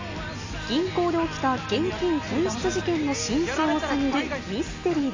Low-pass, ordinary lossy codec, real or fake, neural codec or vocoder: 7.2 kHz; AAC, 32 kbps; real; none